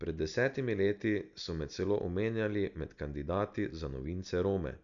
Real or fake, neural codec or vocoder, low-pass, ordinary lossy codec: real; none; 7.2 kHz; none